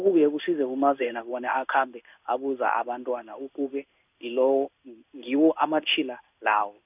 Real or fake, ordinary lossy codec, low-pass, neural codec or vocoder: fake; none; 3.6 kHz; codec, 16 kHz in and 24 kHz out, 1 kbps, XY-Tokenizer